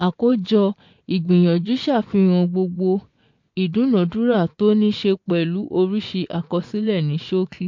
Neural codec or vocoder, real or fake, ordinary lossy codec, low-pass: vocoder, 24 kHz, 100 mel bands, Vocos; fake; MP3, 48 kbps; 7.2 kHz